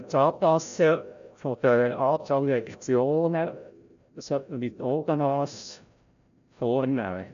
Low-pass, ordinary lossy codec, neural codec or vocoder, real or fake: 7.2 kHz; MP3, 96 kbps; codec, 16 kHz, 0.5 kbps, FreqCodec, larger model; fake